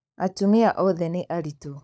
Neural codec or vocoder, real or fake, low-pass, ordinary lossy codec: codec, 16 kHz, 16 kbps, FunCodec, trained on LibriTTS, 50 frames a second; fake; none; none